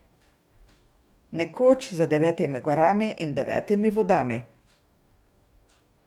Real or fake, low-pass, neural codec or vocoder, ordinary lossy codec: fake; 19.8 kHz; codec, 44.1 kHz, 2.6 kbps, DAC; none